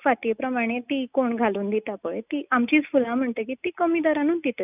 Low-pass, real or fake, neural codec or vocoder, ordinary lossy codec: 3.6 kHz; real; none; none